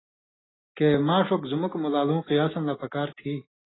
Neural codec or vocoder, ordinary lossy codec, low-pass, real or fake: none; AAC, 16 kbps; 7.2 kHz; real